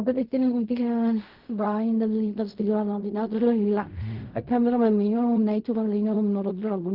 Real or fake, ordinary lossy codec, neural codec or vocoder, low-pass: fake; Opus, 24 kbps; codec, 16 kHz in and 24 kHz out, 0.4 kbps, LongCat-Audio-Codec, fine tuned four codebook decoder; 5.4 kHz